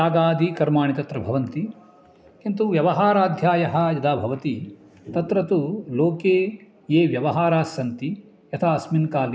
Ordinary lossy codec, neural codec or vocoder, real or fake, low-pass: none; none; real; none